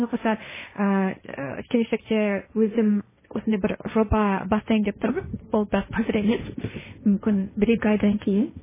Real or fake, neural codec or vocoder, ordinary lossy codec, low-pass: fake; codec, 16 kHz, 1.1 kbps, Voila-Tokenizer; MP3, 16 kbps; 3.6 kHz